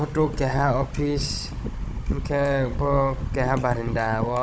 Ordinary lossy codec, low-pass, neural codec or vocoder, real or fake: none; none; codec, 16 kHz, 16 kbps, FunCodec, trained on Chinese and English, 50 frames a second; fake